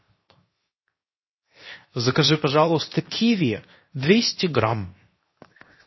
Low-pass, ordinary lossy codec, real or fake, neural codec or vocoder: 7.2 kHz; MP3, 24 kbps; fake; codec, 16 kHz, 0.7 kbps, FocalCodec